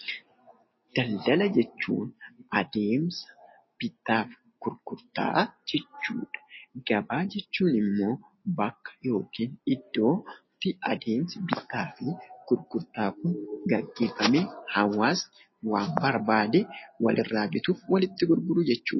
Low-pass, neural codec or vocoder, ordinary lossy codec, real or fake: 7.2 kHz; none; MP3, 24 kbps; real